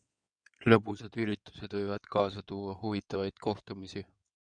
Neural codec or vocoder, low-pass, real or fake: codec, 16 kHz in and 24 kHz out, 2.2 kbps, FireRedTTS-2 codec; 9.9 kHz; fake